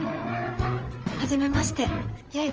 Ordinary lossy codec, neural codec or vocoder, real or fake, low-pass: Opus, 24 kbps; codec, 16 kHz, 8 kbps, FreqCodec, larger model; fake; 7.2 kHz